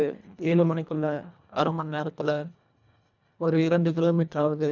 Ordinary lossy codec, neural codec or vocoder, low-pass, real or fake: none; codec, 24 kHz, 1.5 kbps, HILCodec; 7.2 kHz; fake